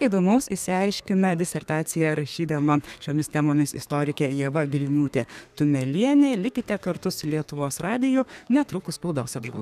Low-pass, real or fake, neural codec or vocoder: 14.4 kHz; fake; codec, 32 kHz, 1.9 kbps, SNAC